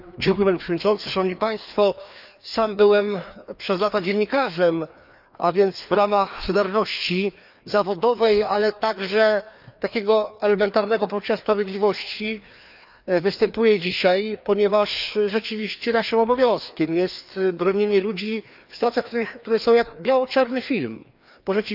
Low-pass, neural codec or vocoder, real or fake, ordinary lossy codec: 5.4 kHz; codec, 16 kHz, 2 kbps, FreqCodec, larger model; fake; none